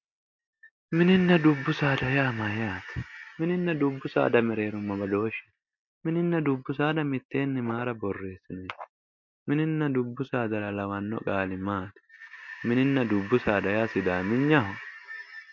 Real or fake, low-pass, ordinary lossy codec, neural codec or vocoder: real; 7.2 kHz; MP3, 48 kbps; none